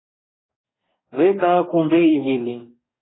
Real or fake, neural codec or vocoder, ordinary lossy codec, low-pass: fake; codec, 44.1 kHz, 2.6 kbps, DAC; AAC, 16 kbps; 7.2 kHz